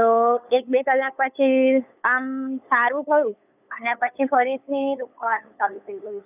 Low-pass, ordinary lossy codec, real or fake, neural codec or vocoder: 3.6 kHz; none; fake; codec, 16 kHz, 8 kbps, FunCodec, trained on LibriTTS, 25 frames a second